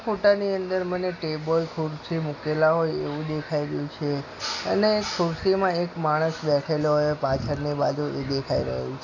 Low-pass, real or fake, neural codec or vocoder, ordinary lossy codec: 7.2 kHz; real; none; AAC, 48 kbps